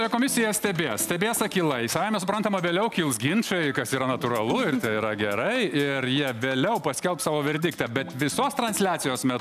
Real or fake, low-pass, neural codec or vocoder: real; 14.4 kHz; none